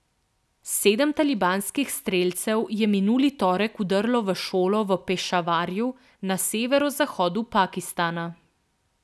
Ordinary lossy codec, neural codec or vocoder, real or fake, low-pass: none; none; real; none